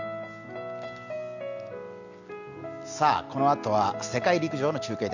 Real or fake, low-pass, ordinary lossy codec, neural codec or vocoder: real; 7.2 kHz; none; none